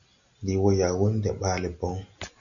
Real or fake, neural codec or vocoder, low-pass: real; none; 7.2 kHz